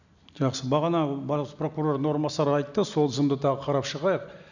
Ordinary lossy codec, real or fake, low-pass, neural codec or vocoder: none; real; 7.2 kHz; none